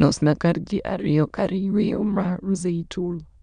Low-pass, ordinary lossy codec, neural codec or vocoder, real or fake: 9.9 kHz; none; autoencoder, 22.05 kHz, a latent of 192 numbers a frame, VITS, trained on many speakers; fake